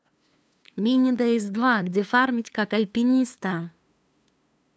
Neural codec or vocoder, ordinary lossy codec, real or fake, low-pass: codec, 16 kHz, 2 kbps, FunCodec, trained on LibriTTS, 25 frames a second; none; fake; none